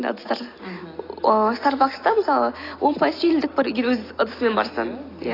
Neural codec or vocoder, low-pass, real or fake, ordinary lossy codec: none; 5.4 kHz; real; AAC, 24 kbps